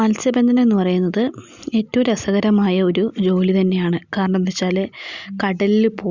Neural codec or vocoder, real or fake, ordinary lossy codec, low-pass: none; real; Opus, 64 kbps; 7.2 kHz